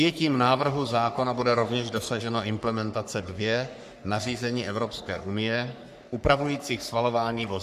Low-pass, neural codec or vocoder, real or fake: 14.4 kHz; codec, 44.1 kHz, 3.4 kbps, Pupu-Codec; fake